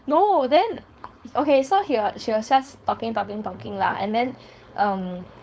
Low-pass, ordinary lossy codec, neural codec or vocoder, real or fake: none; none; codec, 16 kHz, 4.8 kbps, FACodec; fake